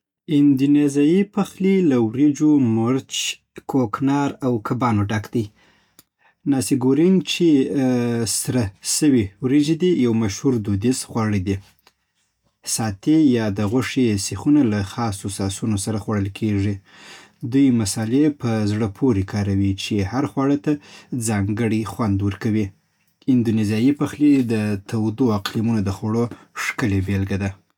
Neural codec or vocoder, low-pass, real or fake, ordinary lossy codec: none; 19.8 kHz; real; none